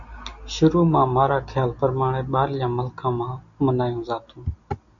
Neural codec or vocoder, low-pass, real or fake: none; 7.2 kHz; real